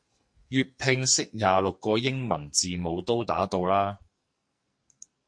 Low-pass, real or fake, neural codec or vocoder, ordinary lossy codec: 9.9 kHz; fake; codec, 44.1 kHz, 2.6 kbps, SNAC; MP3, 48 kbps